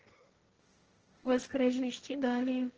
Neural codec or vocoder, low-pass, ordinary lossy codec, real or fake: codec, 16 kHz, 1.1 kbps, Voila-Tokenizer; 7.2 kHz; Opus, 16 kbps; fake